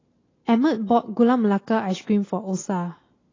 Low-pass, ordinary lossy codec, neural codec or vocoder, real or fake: 7.2 kHz; AAC, 32 kbps; none; real